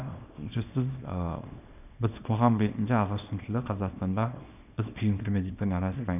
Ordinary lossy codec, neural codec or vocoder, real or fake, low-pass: none; codec, 24 kHz, 0.9 kbps, WavTokenizer, small release; fake; 3.6 kHz